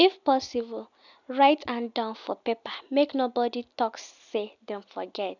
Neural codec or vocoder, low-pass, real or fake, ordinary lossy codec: none; 7.2 kHz; real; none